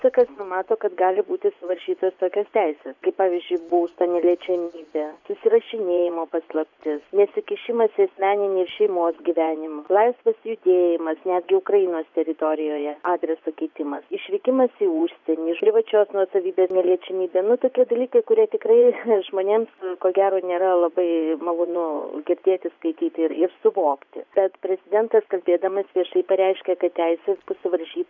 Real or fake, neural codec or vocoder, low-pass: fake; vocoder, 24 kHz, 100 mel bands, Vocos; 7.2 kHz